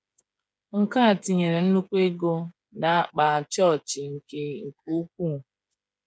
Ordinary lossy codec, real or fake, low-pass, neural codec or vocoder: none; fake; none; codec, 16 kHz, 8 kbps, FreqCodec, smaller model